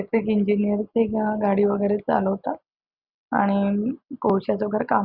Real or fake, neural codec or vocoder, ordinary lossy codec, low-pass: real; none; Opus, 64 kbps; 5.4 kHz